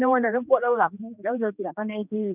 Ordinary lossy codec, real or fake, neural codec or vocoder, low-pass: none; fake; codec, 16 kHz, 2 kbps, X-Codec, HuBERT features, trained on general audio; 3.6 kHz